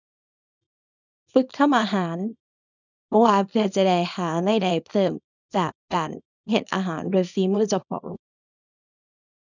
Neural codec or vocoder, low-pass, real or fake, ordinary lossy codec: codec, 24 kHz, 0.9 kbps, WavTokenizer, small release; 7.2 kHz; fake; none